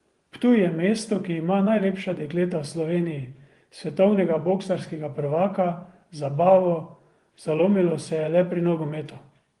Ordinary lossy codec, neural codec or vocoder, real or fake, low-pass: Opus, 24 kbps; vocoder, 24 kHz, 100 mel bands, Vocos; fake; 10.8 kHz